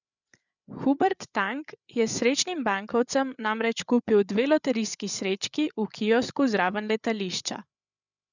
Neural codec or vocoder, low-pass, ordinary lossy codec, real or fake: codec, 16 kHz, 8 kbps, FreqCodec, larger model; 7.2 kHz; none; fake